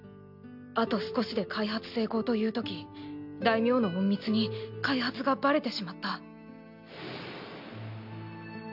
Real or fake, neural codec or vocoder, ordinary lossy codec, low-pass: real; none; none; 5.4 kHz